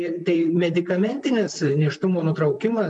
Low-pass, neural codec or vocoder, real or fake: 10.8 kHz; vocoder, 44.1 kHz, 128 mel bands, Pupu-Vocoder; fake